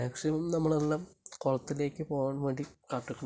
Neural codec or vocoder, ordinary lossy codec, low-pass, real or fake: none; none; none; real